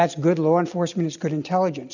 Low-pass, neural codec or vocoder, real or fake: 7.2 kHz; none; real